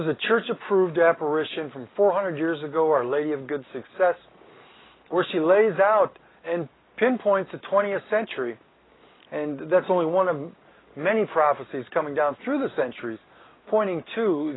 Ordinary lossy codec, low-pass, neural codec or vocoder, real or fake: AAC, 16 kbps; 7.2 kHz; none; real